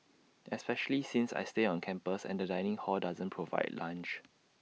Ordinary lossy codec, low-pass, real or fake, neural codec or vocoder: none; none; real; none